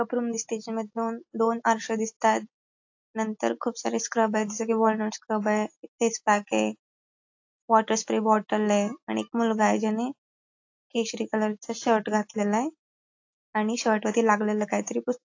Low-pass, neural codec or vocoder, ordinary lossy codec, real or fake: 7.2 kHz; none; AAC, 48 kbps; real